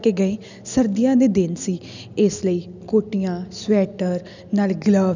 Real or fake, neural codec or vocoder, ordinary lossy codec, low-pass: real; none; none; 7.2 kHz